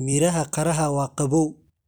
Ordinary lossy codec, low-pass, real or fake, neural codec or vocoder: none; none; real; none